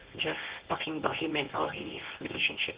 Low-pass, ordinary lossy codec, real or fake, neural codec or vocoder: 3.6 kHz; Opus, 16 kbps; fake; codec, 24 kHz, 0.9 kbps, WavTokenizer, medium speech release version 1